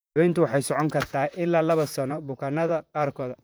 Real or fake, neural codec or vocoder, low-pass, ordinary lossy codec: fake; vocoder, 44.1 kHz, 128 mel bands, Pupu-Vocoder; none; none